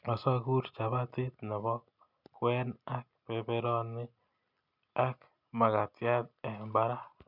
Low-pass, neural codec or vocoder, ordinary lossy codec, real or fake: 5.4 kHz; none; none; real